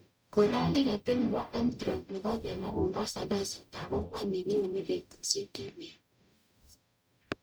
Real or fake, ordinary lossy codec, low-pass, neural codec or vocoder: fake; none; none; codec, 44.1 kHz, 0.9 kbps, DAC